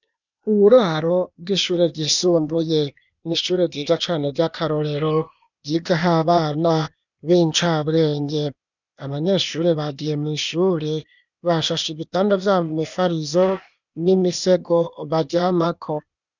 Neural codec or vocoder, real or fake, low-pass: codec, 16 kHz, 0.8 kbps, ZipCodec; fake; 7.2 kHz